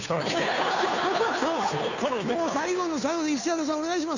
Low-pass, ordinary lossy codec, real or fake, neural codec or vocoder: 7.2 kHz; none; fake; codec, 16 kHz, 2 kbps, FunCodec, trained on Chinese and English, 25 frames a second